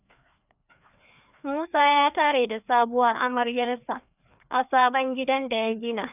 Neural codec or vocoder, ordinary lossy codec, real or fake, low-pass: codec, 16 kHz, 2 kbps, FreqCodec, larger model; none; fake; 3.6 kHz